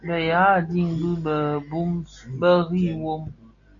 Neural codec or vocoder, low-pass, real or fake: none; 7.2 kHz; real